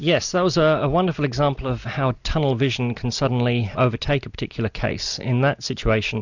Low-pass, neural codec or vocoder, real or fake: 7.2 kHz; none; real